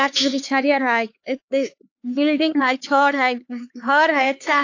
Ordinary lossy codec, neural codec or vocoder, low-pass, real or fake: AAC, 48 kbps; codec, 16 kHz, 4 kbps, X-Codec, HuBERT features, trained on LibriSpeech; 7.2 kHz; fake